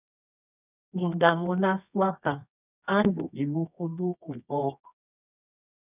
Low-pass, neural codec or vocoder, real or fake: 3.6 kHz; codec, 24 kHz, 0.9 kbps, WavTokenizer, medium music audio release; fake